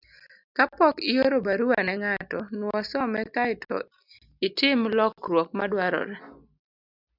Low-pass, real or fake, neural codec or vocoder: 5.4 kHz; real; none